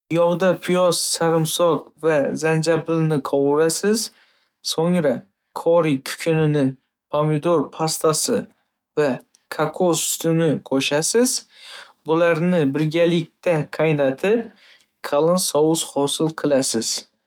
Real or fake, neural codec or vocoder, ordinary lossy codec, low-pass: fake; codec, 44.1 kHz, 7.8 kbps, DAC; none; 19.8 kHz